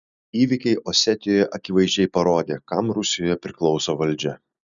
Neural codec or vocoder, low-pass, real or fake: none; 7.2 kHz; real